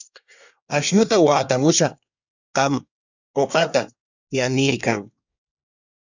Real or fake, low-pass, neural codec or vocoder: fake; 7.2 kHz; codec, 24 kHz, 1 kbps, SNAC